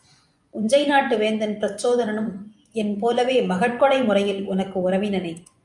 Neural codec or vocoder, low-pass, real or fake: vocoder, 24 kHz, 100 mel bands, Vocos; 10.8 kHz; fake